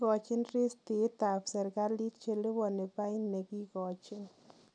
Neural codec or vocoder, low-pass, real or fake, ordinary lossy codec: none; 9.9 kHz; real; none